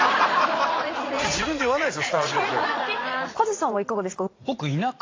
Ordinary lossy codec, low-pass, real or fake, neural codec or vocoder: AAC, 32 kbps; 7.2 kHz; fake; vocoder, 44.1 kHz, 80 mel bands, Vocos